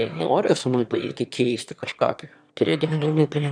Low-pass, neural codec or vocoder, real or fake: 9.9 kHz; autoencoder, 22.05 kHz, a latent of 192 numbers a frame, VITS, trained on one speaker; fake